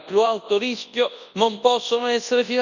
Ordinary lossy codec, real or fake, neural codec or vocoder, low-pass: none; fake; codec, 24 kHz, 0.9 kbps, WavTokenizer, large speech release; 7.2 kHz